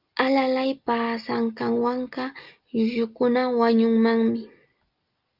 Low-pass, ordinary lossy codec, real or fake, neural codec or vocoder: 5.4 kHz; Opus, 24 kbps; real; none